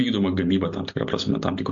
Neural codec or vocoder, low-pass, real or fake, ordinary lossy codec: none; 7.2 kHz; real; MP3, 48 kbps